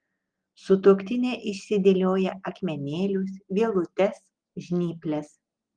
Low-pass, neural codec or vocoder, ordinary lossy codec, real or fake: 9.9 kHz; none; Opus, 24 kbps; real